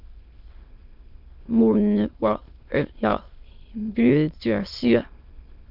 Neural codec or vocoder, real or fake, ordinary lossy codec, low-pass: autoencoder, 22.05 kHz, a latent of 192 numbers a frame, VITS, trained on many speakers; fake; Opus, 32 kbps; 5.4 kHz